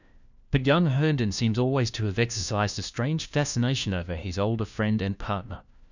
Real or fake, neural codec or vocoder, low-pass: fake; codec, 16 kHz, 1 kbps, FunCodec, trained on LibriTTS, 50 frames a second; 7.2 kHz